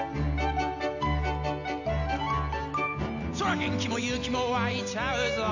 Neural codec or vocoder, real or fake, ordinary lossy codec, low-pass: none; real; none; 7.2 kHz